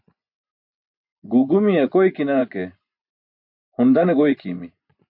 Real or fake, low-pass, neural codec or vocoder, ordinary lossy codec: fake; 5.4 kHz; vocoder, 24 kHz, 100 mel bands, Vocos; MP3, 48 kbps